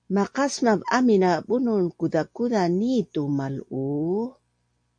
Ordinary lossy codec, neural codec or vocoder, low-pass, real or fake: MP3, 48 kbps; none; 9.9 kHz; real